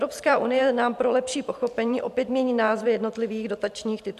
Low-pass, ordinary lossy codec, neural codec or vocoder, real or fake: 14.4 kHz; MP3, 96 kbps; vocoder, 44.1 kHz, 128 mel bands every 512 samples, BigVGAN v2; fake